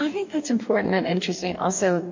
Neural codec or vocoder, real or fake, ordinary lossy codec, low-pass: codec, 44.1 kHz, 2.6 kbps, DAC; fake; MP3, 48 kbps; 7.2 kHz